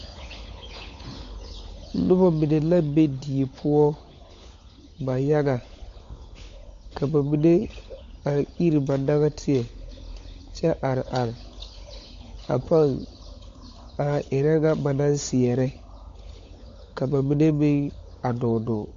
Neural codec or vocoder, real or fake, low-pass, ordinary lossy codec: codec, 16 kHz, 16 kbps, FunCodec, trained on LibriTTS, 50 frames a second; fake; 7.2 kHz; AAC, 48 kbps